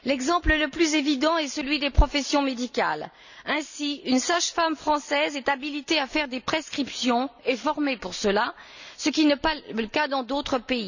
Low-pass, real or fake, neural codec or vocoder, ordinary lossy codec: 7.2 kHz; real; none; none